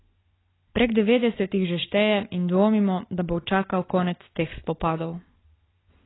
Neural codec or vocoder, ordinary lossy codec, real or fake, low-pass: none; AAC, 16 kbps; real; 7.2 kHz